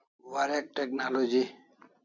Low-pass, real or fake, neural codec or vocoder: 7.2 kHz; real; none